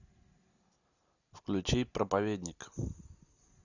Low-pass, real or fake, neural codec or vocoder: 7.2 kHz; real; none